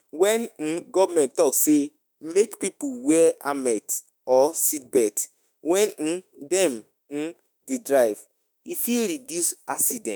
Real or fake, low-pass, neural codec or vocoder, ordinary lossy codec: fake; none; autoencoder, 48 kHz, 32 numbers a frame, DAC-VAE, trained on Japanese speech; none